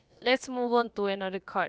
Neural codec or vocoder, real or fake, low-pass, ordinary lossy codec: codec, 16 kHz, about 1 kbps, DyCAST, with the encoder's durations; fake; none; none